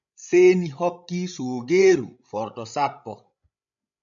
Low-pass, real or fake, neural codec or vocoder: 7.2 kHz; fake; codec, 16 kHz, 16 kbps, FreqCodec, larger model